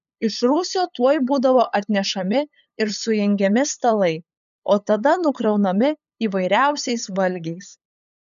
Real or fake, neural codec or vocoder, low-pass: fake; codec, 16 kHz, 8 kbps, FunCodec, trained on LibriTTS, 25 frames a second; 7.2 kHz